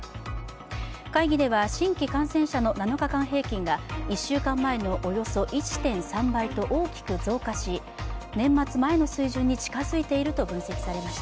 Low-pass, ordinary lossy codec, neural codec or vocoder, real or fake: none; none; none; real